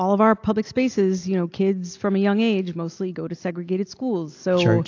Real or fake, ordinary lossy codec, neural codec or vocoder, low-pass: real; AAC, 48 kbps; none; 7.2 kHz